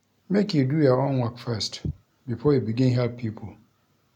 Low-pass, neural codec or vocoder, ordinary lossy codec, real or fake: 19.8 kHz; none; none; real